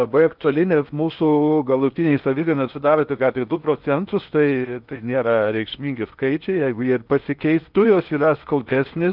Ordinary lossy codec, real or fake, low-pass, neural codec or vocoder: Opus, 24 kbps; fake; 5.4 kHz; codec, 16 kHz in and 24 kHz out, 0.8 kbps, FocalCodec, streaming, 65536 codes